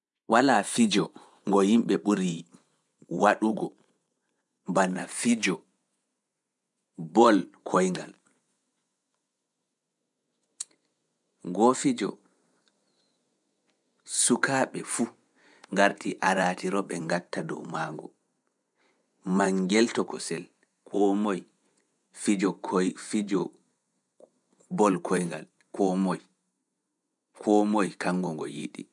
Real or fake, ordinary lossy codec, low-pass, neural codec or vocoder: fake; MP3, 96 kbps; 10.8 kHz; vocoder, 24 kHz, 100 mel bands, Vocos